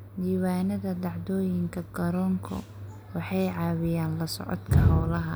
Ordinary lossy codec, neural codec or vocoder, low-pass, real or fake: none; none; none; real